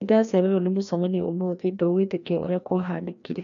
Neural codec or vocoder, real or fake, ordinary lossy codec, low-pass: codec, 16 kHz, 1 kbps, FreqCodec, larger model; fake; none; 7.2 kHz